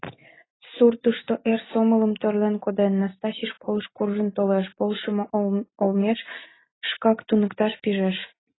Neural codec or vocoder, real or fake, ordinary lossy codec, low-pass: none; real; AAC, 16 kbps; 7.2 kHz